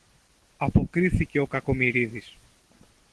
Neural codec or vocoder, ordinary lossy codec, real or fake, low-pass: none; Opus, 16 kbps; real; 10.8 kHz